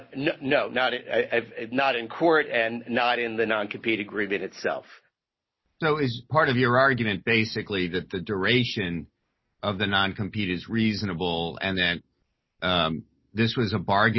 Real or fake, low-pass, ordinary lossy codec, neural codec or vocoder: real; 7.2 kHz; MP3, 24 kbps; none